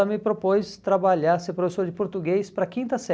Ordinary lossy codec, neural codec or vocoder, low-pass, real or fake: none; none; none; real